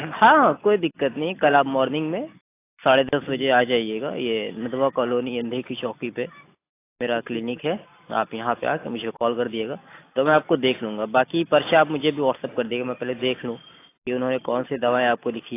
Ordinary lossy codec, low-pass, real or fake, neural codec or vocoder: AAC, 24 kbps; 3.6 kHz; real; none